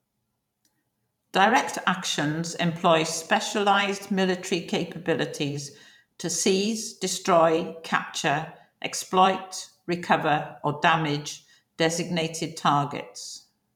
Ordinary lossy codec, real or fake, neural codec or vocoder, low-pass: none; fake; vocoder, 44.1 kHz, 128 mel bands every 512 samples, BigVGAN v2; 19.8 kHz